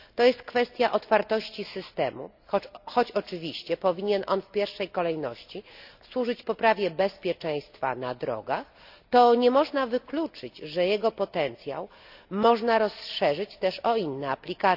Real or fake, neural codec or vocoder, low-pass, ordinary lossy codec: real; none; 5.4 kHz; none